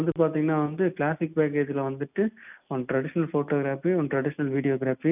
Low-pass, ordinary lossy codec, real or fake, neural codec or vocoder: 3.6 kHz; none; real; none